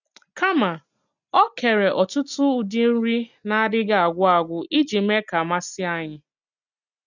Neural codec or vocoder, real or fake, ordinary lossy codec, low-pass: none; real; none; 7.2 kHz